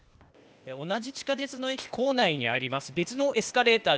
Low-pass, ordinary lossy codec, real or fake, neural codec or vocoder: none; none; fake; codec, 16 kHz, 0.8 kbps, ZipCodec